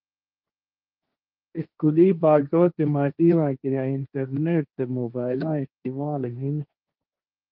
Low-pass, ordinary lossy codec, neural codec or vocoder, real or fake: 5.4 kHz; Opus, 24 kbps; codec, 16 kHz, 1.1 kbps, Voila-Tokenizer; fake